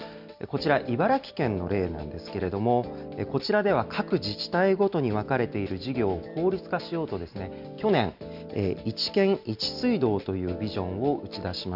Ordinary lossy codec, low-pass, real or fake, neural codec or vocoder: none; 5.4 kHz; real; none